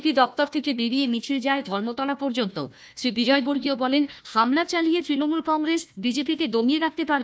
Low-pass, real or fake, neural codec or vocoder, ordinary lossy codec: none; fake; codec, 16 kHz, 1 kbps, FunCodec, trained on Chinese and English, 50 frames a second; none